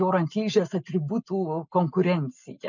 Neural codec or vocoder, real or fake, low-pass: none; real; 7.2 kHz